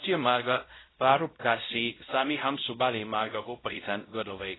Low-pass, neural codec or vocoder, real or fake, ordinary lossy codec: 7.2 kHz; codec, 16 kHz, 0.5 kbps, X-Codec, WavLM features, trained on Multilingual LibriSpeech; fake; AAC, 16 kbps